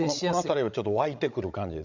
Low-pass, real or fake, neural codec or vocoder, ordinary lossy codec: 7.2 kHz; fake; codec, 16 kHz, 16 kbps, FreqCodec, larger model; none